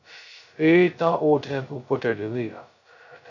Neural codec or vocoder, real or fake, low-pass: codec, 16 kHz, 0.2 kbps, FocalCodec; fake; 7.2 kHz